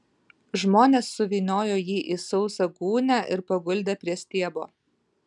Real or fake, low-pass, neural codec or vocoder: real; 10.8 kHz; none